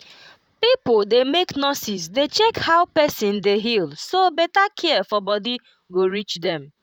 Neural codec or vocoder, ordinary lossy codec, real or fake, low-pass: vocoder, 44.1 kHz, 128 mel bands, Pupu-Vocoder; none; fake; 19.8 kHz